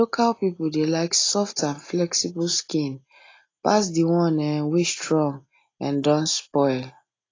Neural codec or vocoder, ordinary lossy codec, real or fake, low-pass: none; AAC, 32 kbps; real; 7.2 kHz